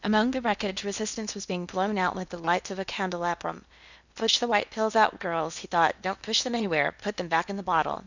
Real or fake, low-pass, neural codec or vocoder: fake; 7.2 kHz; codec, 16 kHz in and 24 kHz out, 0.8 kbps, FocalCodec, streaming, 65536 codes